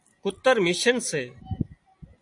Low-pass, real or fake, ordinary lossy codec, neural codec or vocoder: 10.8 kHz; real; AAC, 64 kbps; none